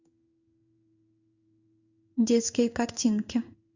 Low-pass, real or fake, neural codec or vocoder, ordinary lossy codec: 7.2 kHz; fake; codec, 16 kHz in and 24 kHz out, 1 kbps, XY-Tokenizer; Opus, 64 kbps